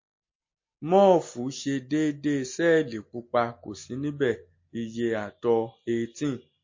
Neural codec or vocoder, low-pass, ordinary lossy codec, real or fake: none; 7.2 kHz; MP3, 32 kbps; real